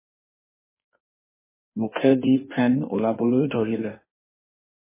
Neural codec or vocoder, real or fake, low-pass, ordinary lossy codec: codec, 16 kHz in and 24 kHz out, 1.1 kbps, FireRedTTS-2 codec; fake; 3.6 kHz; MP3, 16 kbps